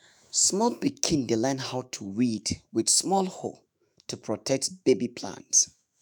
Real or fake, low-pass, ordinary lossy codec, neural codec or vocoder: fake; none; none; autoencoder, 48 kHz, 128 numbers a frame, DAC-VAE, trained on Japanese speech